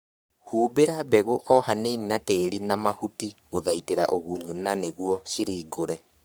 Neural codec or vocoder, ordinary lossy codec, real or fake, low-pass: codec, 44.1 kHz, 3.4 kbps, Pupu-Codec; none; fake; none